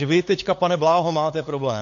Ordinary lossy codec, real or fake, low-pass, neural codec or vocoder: AAC, 48 kbps; fake; 7.2 kHz; codec, 16 kHz, 8 kbps, FunCodec, trained on LibriTTS, 25 frames a second